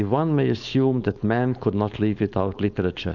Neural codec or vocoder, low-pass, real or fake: codec, 16 kHz, 4.8 kbps, FACodec; 7.2 kHz; fake